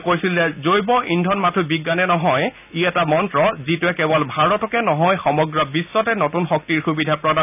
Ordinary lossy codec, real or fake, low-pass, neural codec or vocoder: none; real; 3.6 kHz; none